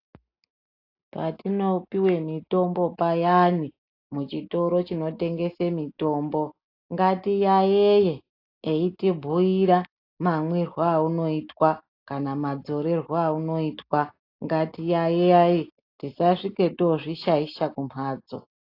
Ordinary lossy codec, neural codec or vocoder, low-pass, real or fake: AAC, 32 kbps; none; 5.4 kHz; real